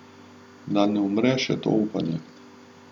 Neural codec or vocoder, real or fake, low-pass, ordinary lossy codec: none; real; 19.8 kHz; none